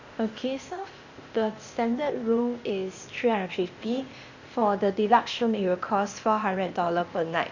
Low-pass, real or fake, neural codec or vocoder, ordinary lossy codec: 7.2 kHz; fake; codec, 16 kHz, 0.8 kbps, ZipCodec; Opus, 64 kbps